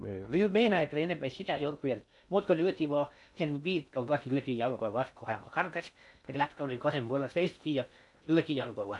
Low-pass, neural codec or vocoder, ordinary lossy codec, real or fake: 10.8 kHz; codec, 16 kHz in and 24 kHz out, 0.6 kbps, FocalCodec, streaming, 2048 codes; none; fake